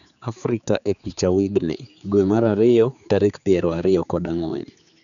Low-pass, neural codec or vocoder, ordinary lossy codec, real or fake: 7.2 kHz; codec, 16 kHz, 4 kbps, X-Codec, HuBERT features, trained on general audio; none; fake